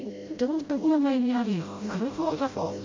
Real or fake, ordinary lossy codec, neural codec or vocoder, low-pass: fake; MP3, 48 kbps; codec, 16 kHz, 0.5 kbps, FreqCodec, smaller model; 7.2 kHz